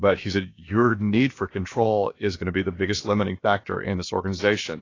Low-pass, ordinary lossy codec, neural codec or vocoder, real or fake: 7.2 kHz; AAC, 32 kbps; codec, 16 kHz, about 1 kbps, DyCAST, with the encoder's durations; fake